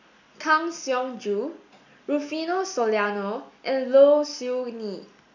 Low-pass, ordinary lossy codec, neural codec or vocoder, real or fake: 7.2 kHz; none; none; real